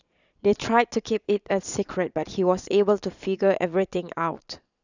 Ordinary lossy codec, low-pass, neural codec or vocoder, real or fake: none; 7.2 kHz; vocoder, 22.05 kHz, 80 mel bands, Vocos; fake